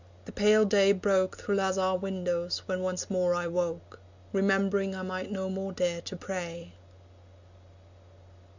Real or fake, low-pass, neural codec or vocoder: real; 7.2 kHz; none